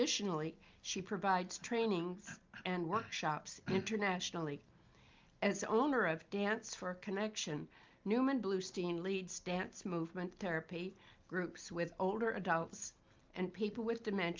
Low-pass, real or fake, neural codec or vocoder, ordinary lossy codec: 7.2 kHz; real; none; Opus, 32 kbps